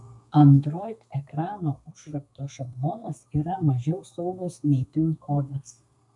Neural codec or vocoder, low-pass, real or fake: codec, 32 kHz, 1.9 kbps, SNAC; 10.8 kHz; fake